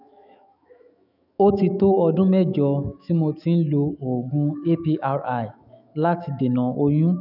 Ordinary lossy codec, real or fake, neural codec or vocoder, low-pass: none; fake; autoencoder, 48 kHz, 128 numbers a frame, DAC-VAE, trained on Japanese speech; 5.4 kHz